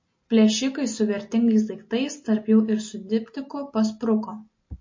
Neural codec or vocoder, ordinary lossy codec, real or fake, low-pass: none; MP3, 32 kbps; real; 7.2 kHz